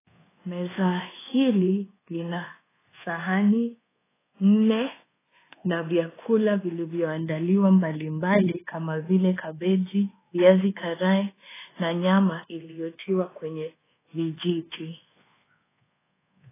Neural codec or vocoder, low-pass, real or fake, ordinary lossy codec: codec, 24 kHz, 1.2 kbps, DualCodec; 3.6 kHz; fake; AAC, 16 kbps